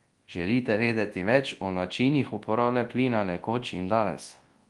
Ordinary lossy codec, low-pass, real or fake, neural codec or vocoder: Opus, 24 kbps; 10.8 kHz; fake; codec, 24 kHz, 0.9 kbps, WavTokenizer, large speech release